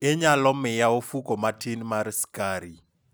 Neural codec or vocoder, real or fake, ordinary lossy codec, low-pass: none; real; none; none